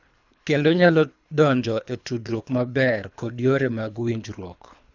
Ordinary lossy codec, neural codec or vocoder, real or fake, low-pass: none; codec, 24 kHz, 3 kbps, HILCodec; fake; 7.2 kHz